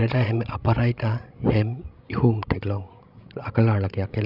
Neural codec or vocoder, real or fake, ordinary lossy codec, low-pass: codec, 16 kHz, 16 kbps, FreqCodec, larger model; fake; none; 5.4 kHz